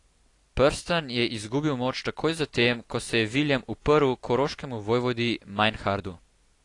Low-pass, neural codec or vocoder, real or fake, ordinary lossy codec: 10.8 kHz; none; real; AAC, 48 kbps